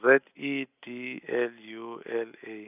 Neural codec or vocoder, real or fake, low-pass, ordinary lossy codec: none; real; 3.6 kHz; none